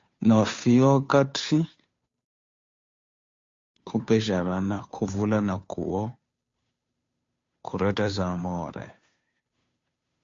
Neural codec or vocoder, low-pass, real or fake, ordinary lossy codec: codec, 16 kHz, 2 kbps, FunCodec, trained on Chinese and English, 25 frames a second; 7.2 kHz; fake; MP3, 48 kbps